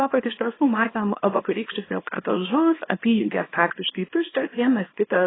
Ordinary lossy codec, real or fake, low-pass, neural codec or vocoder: AAC, 16 kbps; fake; 7.2 kHz; codec, 24 kHz, 0.9 kbps, WavTokenizer, small release